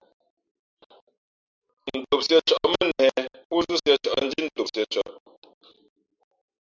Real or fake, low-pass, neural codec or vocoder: real; 7.2 kHz; none